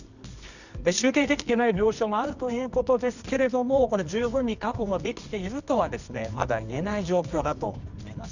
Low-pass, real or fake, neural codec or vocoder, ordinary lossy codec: 7.2 kHz; fake; codec, 24 kHz, 0.9 kbps, WavTokenizer, medium music audio release; none